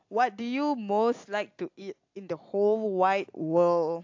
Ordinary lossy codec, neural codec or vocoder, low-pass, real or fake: AAC, 48 kbps; none; 7.2 kHz; real